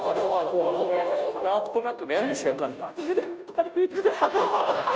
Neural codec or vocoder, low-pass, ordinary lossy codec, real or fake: codec, 16 kHz, 0.5 kbps, FunCodec, trained on Chinese and English, 25 frames a second; none; none; fake